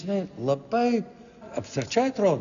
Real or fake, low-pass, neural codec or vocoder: real; 7.2 kHz; none